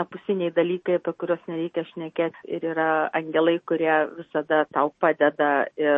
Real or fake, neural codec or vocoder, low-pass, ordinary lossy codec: real; none; 10.8 kHz; MP3, 32 kbps